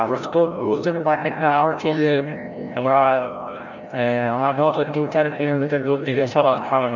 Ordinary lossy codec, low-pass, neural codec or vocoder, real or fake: none; 7.2 kHz; codec, 16 kHz, 0.5 kbps, FreqCodec, larger model; fake